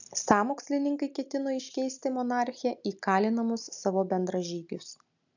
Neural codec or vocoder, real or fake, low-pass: none; real; 7.2 kHz